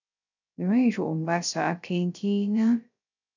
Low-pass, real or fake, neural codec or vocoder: 7.2 kHz; fake; codec, 16 kHz, 0.3 kbps, FocalCodec